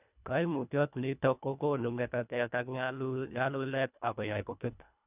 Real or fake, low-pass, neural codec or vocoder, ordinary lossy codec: fake; 3.6 kHz; codec, 24 kHz, 1.5 kbps, HILCodec; none